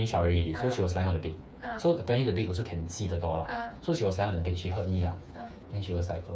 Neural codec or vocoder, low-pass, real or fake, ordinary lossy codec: codec, 16 kHz, 4 kbps, FreqCodec, smaller model; none; fake; none